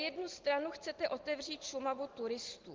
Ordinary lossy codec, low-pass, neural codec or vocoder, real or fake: Opus, 16 kbps; 7.2 kHz; none; real